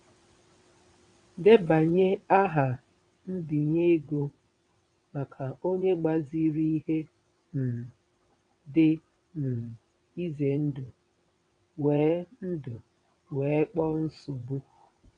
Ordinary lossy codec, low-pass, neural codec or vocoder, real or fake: MP3, 96 kbps; 9.9 kHz; vocoder, 22.05 kHz, 80 mel bands, WaveNeXt; fake